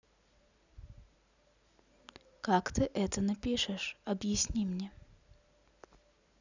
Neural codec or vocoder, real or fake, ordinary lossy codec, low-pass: none; real; none; 7.2 kHz